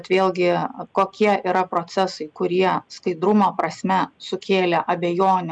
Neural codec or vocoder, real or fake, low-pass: none; real; 9.9 kHz